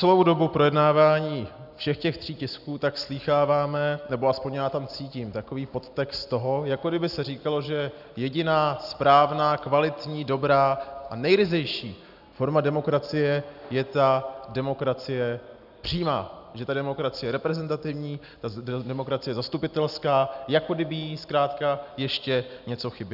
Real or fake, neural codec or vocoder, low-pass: real; none; 5.4 kHz